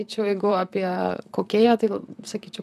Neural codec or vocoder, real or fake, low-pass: vocoder, 44.1 kHz, 128 mel bands, Pupu-Vocoder; fake; 14.4 kHz